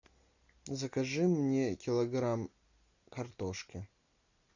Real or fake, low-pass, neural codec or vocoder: real; 7.2 kHz; none